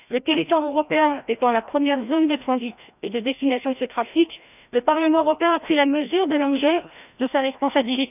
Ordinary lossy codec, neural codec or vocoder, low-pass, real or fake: none; codec, 16 kHz, 1 kbps, FreqCodec, larger model; 3.6 kHz; fake